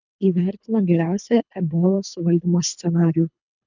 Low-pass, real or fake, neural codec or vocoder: 7.2 kHz; fake; codec, 24 kHz, 3 kbps, HILCodec